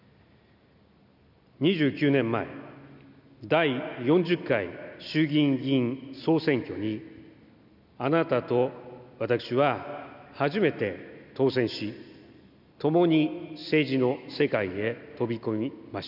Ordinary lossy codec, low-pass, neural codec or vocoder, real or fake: none; 5.4 kHz; none; real